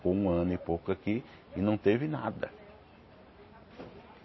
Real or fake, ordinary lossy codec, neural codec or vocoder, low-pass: real; MP3, 24 kbps; none; 7.2 kHz